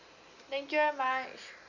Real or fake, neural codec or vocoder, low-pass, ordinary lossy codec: real; none; 7.2 kHz; none